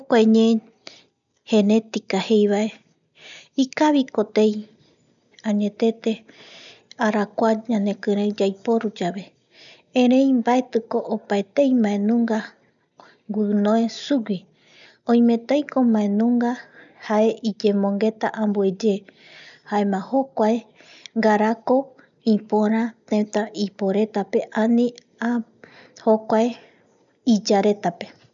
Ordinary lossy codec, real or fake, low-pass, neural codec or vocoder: none; real; 7.2 kHz; none